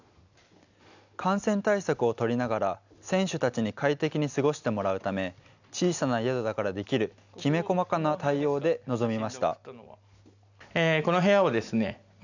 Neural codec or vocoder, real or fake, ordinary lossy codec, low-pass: none; real; none; 7.2 kHz